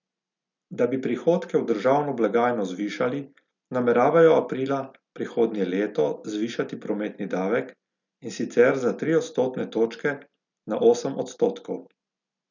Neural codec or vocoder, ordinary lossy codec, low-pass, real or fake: none; none; 7.2 kHz; real